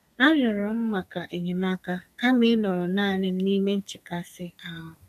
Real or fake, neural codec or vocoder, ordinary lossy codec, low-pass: fake; codec, 32 kHz, 1.9 kbps, SNAC; none; 14.4 kHz